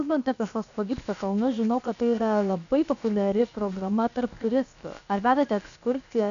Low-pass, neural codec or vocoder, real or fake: 7.2 kHz; codec, 16 kHz, about 1 kbps, DyCAST, with the encoder's durations; fake